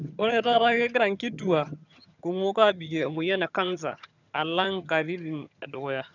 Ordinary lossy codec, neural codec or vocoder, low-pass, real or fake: none; vocoder, 22.05 kHz, 80 mel bands, HiFi-GAN; 7.2 kHz; fake